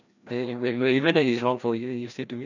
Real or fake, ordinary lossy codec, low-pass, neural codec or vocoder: fake; none; 7.2 kHz; codec, 16 kHz, 1 kbps, FreqCodec, larger model